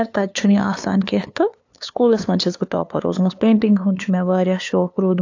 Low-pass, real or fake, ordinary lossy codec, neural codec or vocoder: 7.2 kHz; fake; AAC, 48 kbps; codec, 16 kHz, 2 kbps, FunCodec, trained on LibriTTS, 25 frames a second